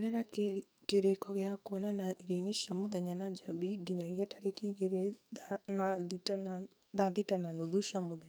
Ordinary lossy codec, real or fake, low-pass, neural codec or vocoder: none; fake; none; codec, 44.1 kHz, 2.6 kbps, SNAC